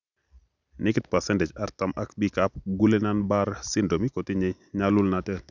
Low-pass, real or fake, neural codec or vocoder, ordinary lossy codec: 7.2 kHz; real; none; none